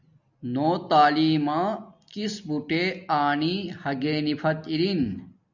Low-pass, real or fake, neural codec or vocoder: 7.2 kHz; real; none